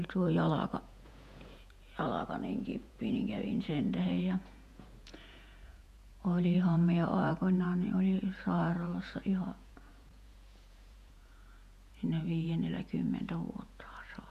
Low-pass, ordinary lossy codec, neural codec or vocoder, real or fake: 14.4 kHz; none; vocoder, 48 kHz, 128 mel bands, Vocos; fake